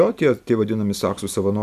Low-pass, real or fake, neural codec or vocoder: 14.4 kHz; real; none